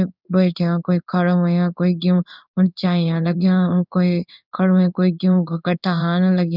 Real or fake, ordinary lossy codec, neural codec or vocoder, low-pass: fake; none; codec, 16 kHz in and 24 kHz out, 1 kbps, XY-Tokenizer; 5.4 kHz